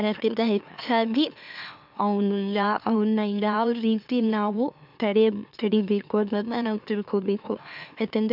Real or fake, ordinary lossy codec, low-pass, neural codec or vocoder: fake; AAC, 48 kbps; 5.4 kHz; autoencoder, 44.1 kHz, a latent of 192 numbers a frame, MeloTTS